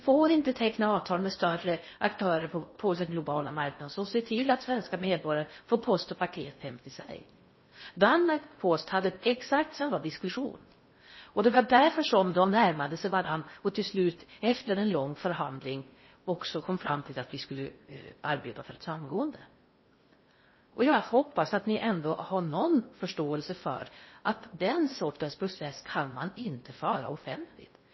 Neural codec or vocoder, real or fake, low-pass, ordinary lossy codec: codec, 16 kHz in and 24 kHz out, 0.6 kbps, FocalCodec, streaming, 4096 codes; fake; 7.2 kHz; MP3, 24 kbps